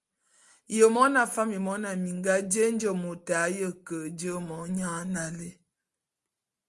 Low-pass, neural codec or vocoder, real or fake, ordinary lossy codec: 10.8 kHz; none; real; Opus, 32 kbps